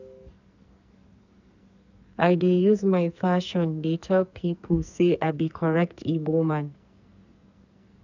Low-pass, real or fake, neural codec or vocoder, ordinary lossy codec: 7.2 kHz; fake; codec, 44.1 kHz, 2.6 kbps, SNAC; none